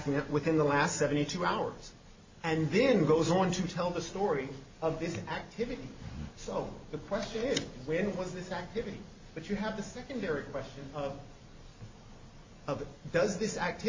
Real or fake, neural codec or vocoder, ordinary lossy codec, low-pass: real; none; MP3, 32 kbps; 7.2 kHz